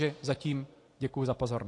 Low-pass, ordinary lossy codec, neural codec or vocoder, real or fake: 10.8 kHz; AAC, 48 kbps; none; real